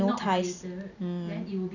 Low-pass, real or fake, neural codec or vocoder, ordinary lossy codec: 7.2 kHz; real; none; none